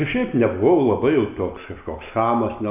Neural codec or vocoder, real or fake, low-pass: none; real; 3.6 kHz